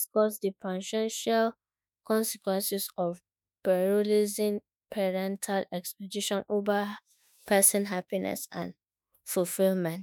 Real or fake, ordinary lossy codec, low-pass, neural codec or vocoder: fake; none; none; autoencoder, 48 kHz, 32 numbers a frame, DAC-VAE, trained on Japanese speech